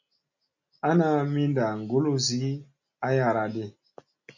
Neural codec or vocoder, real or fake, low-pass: none; real; 7.2 kHz